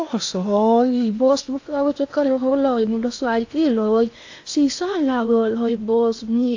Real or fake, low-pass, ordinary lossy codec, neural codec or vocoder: fake; 7.2 kHz; AAC, 48 kbps; codec, 16 kHz in and 24 kHz out, 0.8 kbps, FocalCodec, streaming, 65536 codes